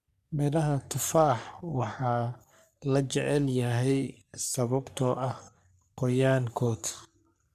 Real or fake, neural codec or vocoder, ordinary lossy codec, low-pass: fake; codec, 44.1 kHz, 3.4 kbps, Pupu-Codec; none; 14.4 kHz